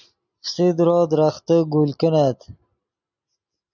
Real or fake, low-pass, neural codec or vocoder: real; 7.2 kHz; none